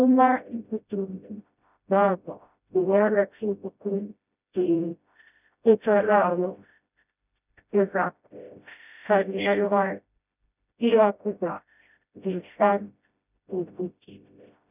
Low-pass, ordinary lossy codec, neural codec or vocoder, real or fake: 3.6 kHz; none; codec, 16 kHz, 0.5 kbps, FreqCodec, smaller model; fake